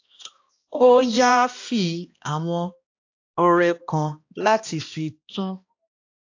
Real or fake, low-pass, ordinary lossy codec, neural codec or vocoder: fake; 7.2 kHz; AAC, 48 kbps; codec, 16 kHz, 1 kbps, X-Codec, HuBERT features, trained on balanced general audio